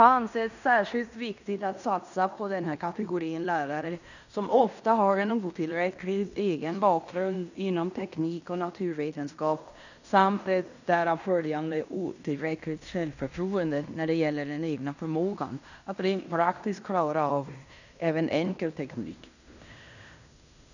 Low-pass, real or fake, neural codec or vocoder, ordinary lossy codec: 7.2 kHz; fake; codec, 16 kHz in and 24 kHz out, 0.9 kbps, LongCat-Audio-Codec, fine tuned four codebook decoder; none